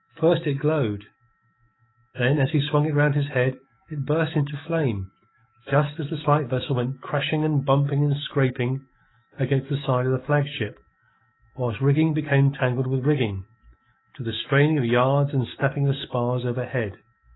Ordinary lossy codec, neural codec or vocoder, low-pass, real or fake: AAC, 16 kbps; codec, 44.1 kHz, 7.8 kbps, DAC; 7.2 kHz; fake